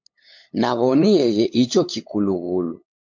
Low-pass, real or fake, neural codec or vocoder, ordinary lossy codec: 7.2 kHz; fake; codec, 16 kHz, 8 kbps, FunCodec, trained on LibriTTS, 25 frames a second; MP3, 48 kbps